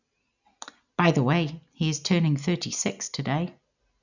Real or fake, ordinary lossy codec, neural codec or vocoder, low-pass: real; none; none; 7.2 kHz